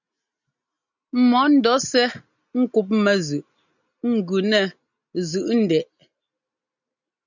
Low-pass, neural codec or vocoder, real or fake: 7.2 kHz; none; real